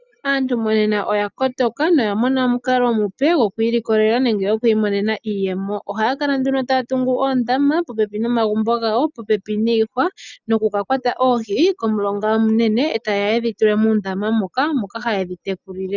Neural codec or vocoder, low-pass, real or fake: none; 7.2 kHz; real